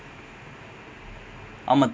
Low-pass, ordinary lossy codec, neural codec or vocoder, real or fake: none; none; none; real